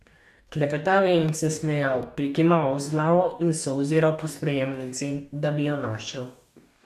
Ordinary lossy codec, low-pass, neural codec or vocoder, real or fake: none; 14.4 kHz; codec, 44.1 kHz, 2.6 kbps, DAC; fake